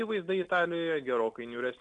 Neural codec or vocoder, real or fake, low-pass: none; real; 9.9 kHz